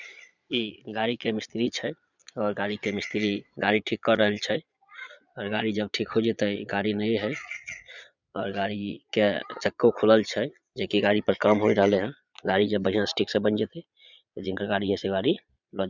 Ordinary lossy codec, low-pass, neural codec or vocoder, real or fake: none; 7.2 kHz; vocoder, 22.05 kHz, 80 mel bands, Vocos; fake